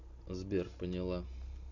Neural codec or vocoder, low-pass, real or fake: none; 7.2 kHz; real